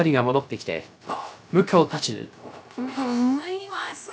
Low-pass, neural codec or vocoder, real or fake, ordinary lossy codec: none; codec, 16 kHz, 0.3 kbps, FocalCodec; fake; none